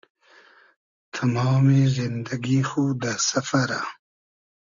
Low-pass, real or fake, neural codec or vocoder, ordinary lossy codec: 7.2 kHz; real; none; Opus, 64 kbps